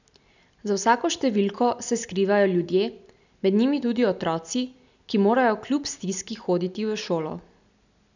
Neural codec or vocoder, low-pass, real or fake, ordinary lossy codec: none; 7.2 kHz; real; none